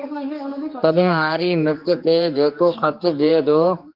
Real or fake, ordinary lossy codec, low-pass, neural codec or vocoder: fake; Opus, 24 kbps; 5.4 kHz; codec, 16 kHz, 2 kbps, X-Codec, HuBERT features, trained on general audio